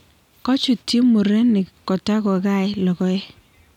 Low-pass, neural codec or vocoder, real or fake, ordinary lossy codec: 19.8 kHz; none; real; none